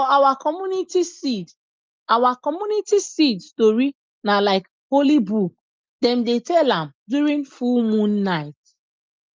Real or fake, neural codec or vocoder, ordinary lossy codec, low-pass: real; none; Opus, 24 kbps; 7.2 kHz